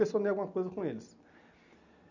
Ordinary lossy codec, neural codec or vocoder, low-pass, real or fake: none; none; 7.2 kHz; real